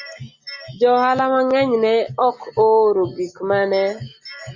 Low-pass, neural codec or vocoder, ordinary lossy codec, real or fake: 7.2 kHz; none; Opus, 64 kbps; real